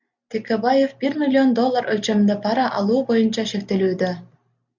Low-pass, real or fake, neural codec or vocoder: 7.2 kHz; real; none